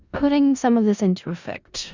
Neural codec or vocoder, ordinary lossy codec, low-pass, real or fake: codec, 16 kHz in and 24 kHz out, 0.4 kbps, LongCat-Audio-Codec, four codebook decoder; Opus, 64 kbps; 7.2 kHz; fake